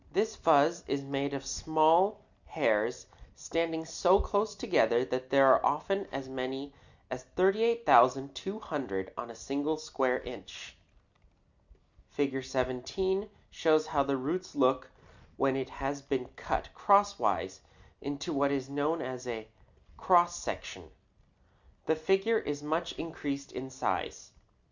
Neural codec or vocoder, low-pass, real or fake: none; 7.2 kHz; real